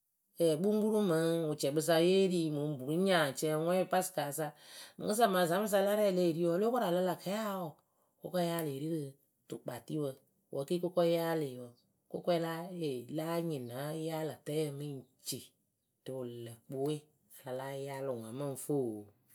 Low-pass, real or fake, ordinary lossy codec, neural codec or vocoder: none; real; none; none